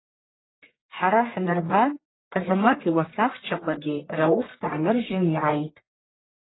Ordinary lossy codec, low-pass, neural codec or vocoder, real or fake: AAC, 16 kbps; 7.2 kHz; codec, 44.1 kHz, 1.7 kbps, Pupu-Codec; fake